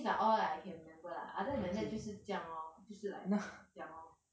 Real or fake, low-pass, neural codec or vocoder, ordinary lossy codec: real; none; none; none